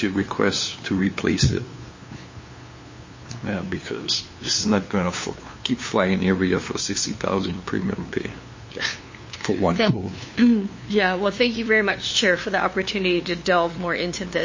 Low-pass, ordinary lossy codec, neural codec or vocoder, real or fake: 7.2 kHz; MP3, 32 kbps; codec, 16 kHz, 2 kbps, FunCodec, trained on LibriTTS, 25 frames a second; fake